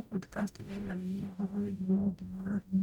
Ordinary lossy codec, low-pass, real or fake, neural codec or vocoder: none; 19.8 kHz; fake; codec, 44.1 kHz, 0.9 kbps, DAC